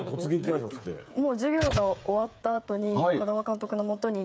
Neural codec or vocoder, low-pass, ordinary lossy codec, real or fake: codec, 16 kHz, 8 kbps, FreqCodec, smaller model; none; none; fake